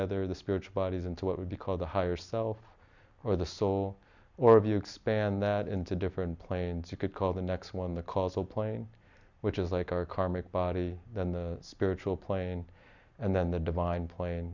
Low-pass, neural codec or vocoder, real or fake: 7.2 kHz; none; real